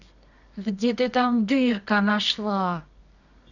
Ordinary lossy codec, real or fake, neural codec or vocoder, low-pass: none; fake; codec, 24 kHz, 0.9 kbps, WavTokenizer, medium music audio release; 7.2 kHz